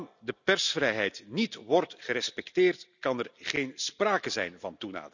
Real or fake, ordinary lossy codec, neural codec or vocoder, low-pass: real; none; none; 7.2 kHz